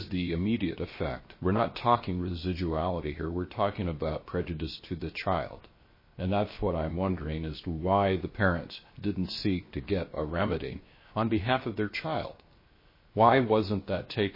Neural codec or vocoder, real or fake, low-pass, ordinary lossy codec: codec, 16 kHz, 0.7 kbps, FocalCodec; fake; 5.4 kHz; MP3, 24 kbps